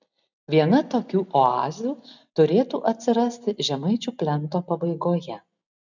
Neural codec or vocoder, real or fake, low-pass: none; real; 7.2 kHz